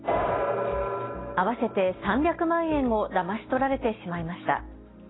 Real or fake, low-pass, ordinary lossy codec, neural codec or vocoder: real; 7.2 kHz; AAC, 16 kbps; none